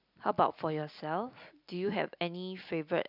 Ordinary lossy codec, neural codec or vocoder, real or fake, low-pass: none; none; real; 5.4 kHz